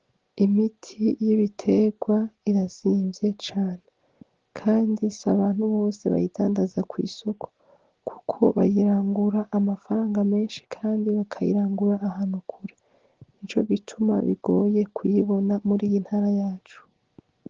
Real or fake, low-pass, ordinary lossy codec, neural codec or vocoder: real; 7.2 kHz; Opus, 16 kbps; none